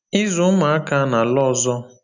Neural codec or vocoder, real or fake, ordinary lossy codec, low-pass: none; real; none; 7.2 kHz